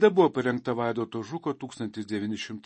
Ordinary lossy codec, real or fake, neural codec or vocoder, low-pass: MP3, 32 kbps; real; none; 9.9 kHz